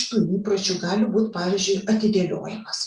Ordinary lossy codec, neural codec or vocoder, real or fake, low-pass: Opus, 64 kbps; none; real; 10.8 kHz